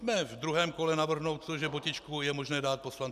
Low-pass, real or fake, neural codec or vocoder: 14.4 kHz; real; none